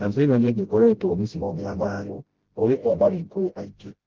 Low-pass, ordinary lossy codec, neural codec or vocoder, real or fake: 7.2 kHz; Opus, 16 kbps; codec, 16 kHz, 0.5 kbps, FreqCodec, smaller model; fake